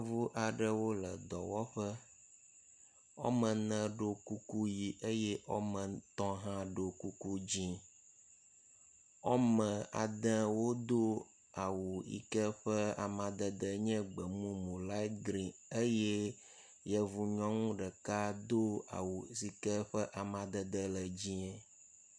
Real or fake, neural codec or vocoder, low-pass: real; none; 9.9 kHz